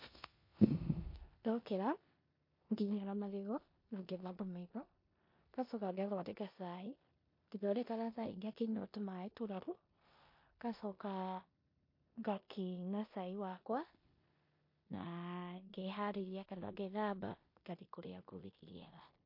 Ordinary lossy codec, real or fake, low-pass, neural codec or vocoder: MP3, 32 kbps; fake; 5.4 kHz; codec, 16 kHz in and 24 kHz out, 0.9 kbps, LongCat-Audio-Codec, four codebook decoder